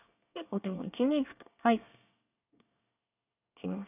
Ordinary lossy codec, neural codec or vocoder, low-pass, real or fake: none; codec, 24 kHz, 1 kbps, SNAC; 3.6 kHz; fake